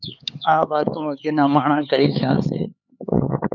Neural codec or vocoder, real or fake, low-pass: codec, 16 kHz, 4 kbps, X-Codec, HuBERT features, trained on LibriSpeech; fake; 7.2 kHz